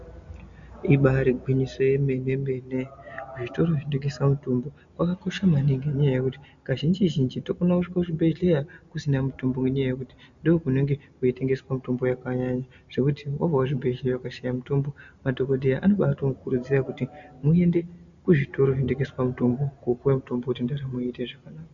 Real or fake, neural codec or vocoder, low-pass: real; none; 7.2 kHz